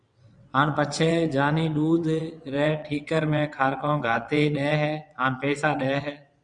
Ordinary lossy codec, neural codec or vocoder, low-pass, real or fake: Opus, 64 kbps; vocoder, 22.05 kHz, 80 mel bands, WaveNeXt; 9.9 kHz; fake